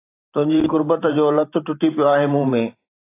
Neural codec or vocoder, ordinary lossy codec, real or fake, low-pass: vocoder, 44.1 kHz, 128 mel bands every 512 samples, BigVGAN v2; AAC, 24 kbps; fake; 3.6 kHz